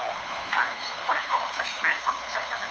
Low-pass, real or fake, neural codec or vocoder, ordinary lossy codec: none; fake; codec, 16 kHz, 4 kbps, FunCodec, trained on LibriTTS, 50 frames a second; none